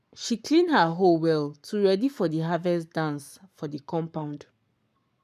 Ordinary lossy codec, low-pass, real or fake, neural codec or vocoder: none; 14.4 kHz; fake; codec, 44.1 kHz, 7.8 kbps, Pupu-Codec